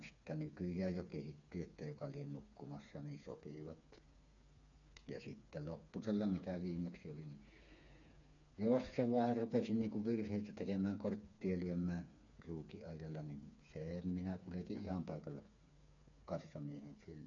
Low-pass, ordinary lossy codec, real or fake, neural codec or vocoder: 7.2 kHz; none; fake; codec, 16 kHz, 4 kbps, FreqCodec, smaller model